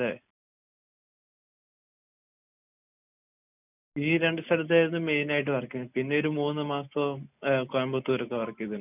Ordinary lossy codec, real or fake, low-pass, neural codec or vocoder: none; real; 3.6 kHz; none